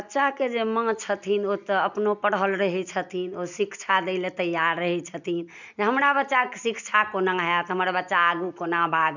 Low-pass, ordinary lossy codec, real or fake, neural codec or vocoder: 7.2 kHz; none; real; none